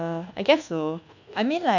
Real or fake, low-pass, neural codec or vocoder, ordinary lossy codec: fake; 7.2 kHz; codec, 24 kHz, 1.2 kbps, DualCodec; none